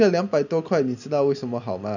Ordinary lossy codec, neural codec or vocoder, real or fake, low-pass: none; none; real; 7.2 kHz